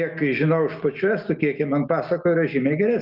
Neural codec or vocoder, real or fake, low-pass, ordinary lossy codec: none; real; 5.4 kHz; Opus, 32 kbps